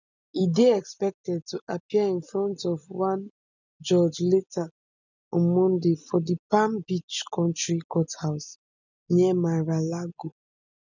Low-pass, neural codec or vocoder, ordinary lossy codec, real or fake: 7.2 kHz; none; none; real